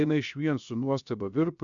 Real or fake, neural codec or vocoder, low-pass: fake; codec, 16 kHz, 0.7 kbps, FocalCodec; 7.2 kHz